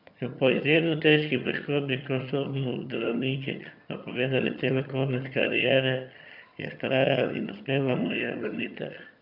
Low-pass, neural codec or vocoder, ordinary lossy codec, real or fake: 5.4 kHz; vocoder, 22.05 kHz, 80 mel bands, HiFi-GAN; none; fake